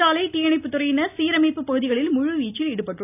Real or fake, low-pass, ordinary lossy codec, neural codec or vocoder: real; 3.6 kHz; none; none